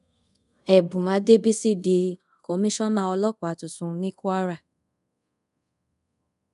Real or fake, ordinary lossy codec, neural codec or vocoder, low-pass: fake; none; codec, 24 kHz, 0.5 kbps, DualCodec; 10.8 kHz